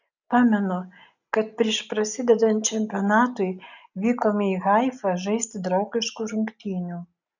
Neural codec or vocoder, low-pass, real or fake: none; 7.2 kHz; real